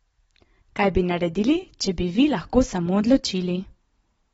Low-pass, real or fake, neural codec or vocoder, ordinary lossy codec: 19.8 kHz; real; none; AAC, 24 kbps